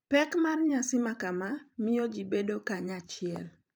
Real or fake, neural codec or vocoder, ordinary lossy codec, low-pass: fake; vocoder, 44.1 kHz, 128 mel bands every 512 samples, BigVGAN v2; none; none